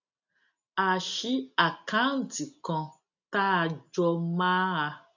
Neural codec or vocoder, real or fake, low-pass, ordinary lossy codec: none; real; 7.2 kHz; none